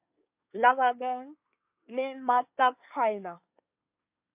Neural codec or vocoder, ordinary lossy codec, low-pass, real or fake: codec, 24 kHz, 1 kbps, SNAC; AAC, 32 kbps; 3.6 kHz; fake